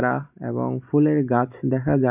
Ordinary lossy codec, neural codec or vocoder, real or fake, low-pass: none; none; real; 3.6 kHz